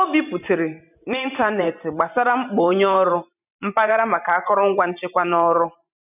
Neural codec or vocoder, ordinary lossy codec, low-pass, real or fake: vocoder, 44.1 kHz, 128 mel bands every 512 samples, BigVGAN v2; none; 3.6 kHz; fake